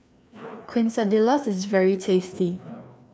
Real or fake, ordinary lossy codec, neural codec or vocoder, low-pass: fake; none; codec, 16 kHz, 2 kbps, FreqCodec, larger model; none